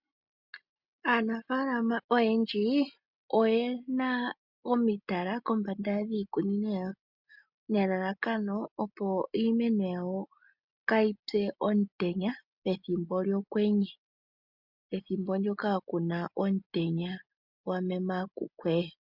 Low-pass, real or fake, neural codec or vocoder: 5.4 kHz; real; none